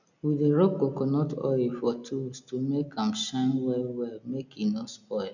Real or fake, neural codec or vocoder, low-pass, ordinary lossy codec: real; none; 7.2 kHz; none